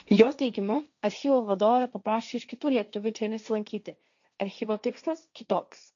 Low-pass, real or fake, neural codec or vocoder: 7.2 kHz; fake; codec, 16 kHz, 1.1 kbps, Voila-Tokenizer